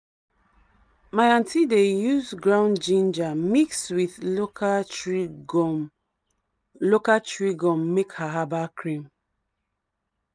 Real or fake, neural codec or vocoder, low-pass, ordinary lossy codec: real; none; 9.9 kHz; none